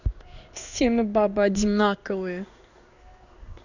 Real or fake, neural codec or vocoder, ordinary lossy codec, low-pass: fake; codec, 16 kHz in and 24 kHz out, 1 kbps, XY-Tokenizer; none; 7.2 kHz